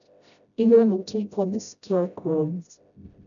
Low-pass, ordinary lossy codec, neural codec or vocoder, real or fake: 7.2 kHz; none; codec, 16 kHz, 0.5 kbps, FreqCodec, smaller model; fake